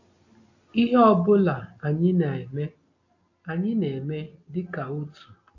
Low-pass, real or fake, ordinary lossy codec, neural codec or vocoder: 7.2 kHz; real; AAC, 48 kbps; none